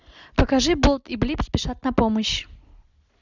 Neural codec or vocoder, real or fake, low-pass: none; real; 7.2 kHz